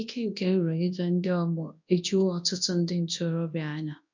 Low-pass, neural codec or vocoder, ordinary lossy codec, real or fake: 7.2 kHz; codec, 24 kHz, 0.9 kbps, WavTokenizer, large speech release; MP3, 48 kbps; fake